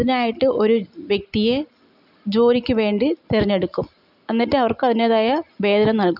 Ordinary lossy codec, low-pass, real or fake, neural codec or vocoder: none; 5.4 kHz; real; none